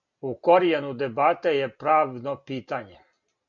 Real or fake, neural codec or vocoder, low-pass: real; none; 7.2 kHz